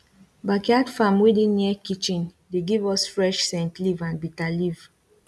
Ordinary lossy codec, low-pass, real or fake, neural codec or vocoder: none; none; real; none